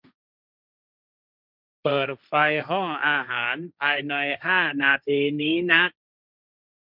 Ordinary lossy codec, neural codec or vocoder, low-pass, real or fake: none; codec, 16 kHz, 1.1 kbps, Voila-Tokenizer; 5.4 kHz; fake